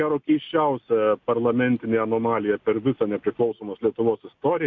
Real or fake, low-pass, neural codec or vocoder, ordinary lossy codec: real; 7.2 kHz; none; MP3, 64 kbps